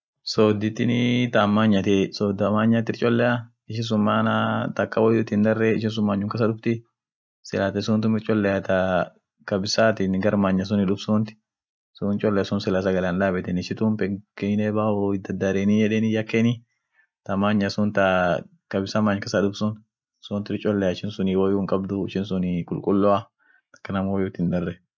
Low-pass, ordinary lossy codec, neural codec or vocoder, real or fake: none; none; none; real